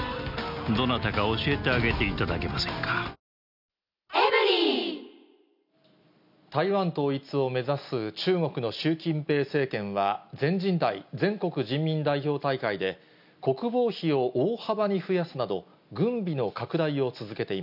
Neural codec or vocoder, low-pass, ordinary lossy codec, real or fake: none; 5.4 kHz; none; real